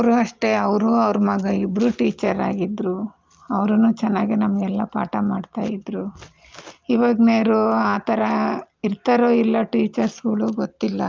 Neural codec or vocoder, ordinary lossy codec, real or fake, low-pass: none; Opus, 24 kbps; real; 7.2 kHz